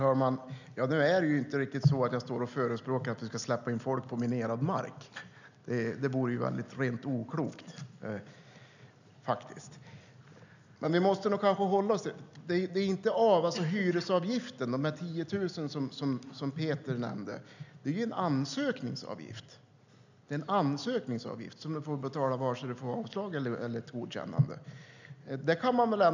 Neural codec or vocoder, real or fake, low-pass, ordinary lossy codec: none; real; 7.2 kHz; none